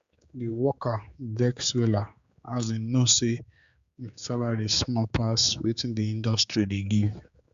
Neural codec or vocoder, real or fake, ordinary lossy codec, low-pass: codec, 16 kHz, 4 kbps, X-Codec, HuBERT features, trained on general audio; fake; Opus, 64 kbps; 7.2 kHz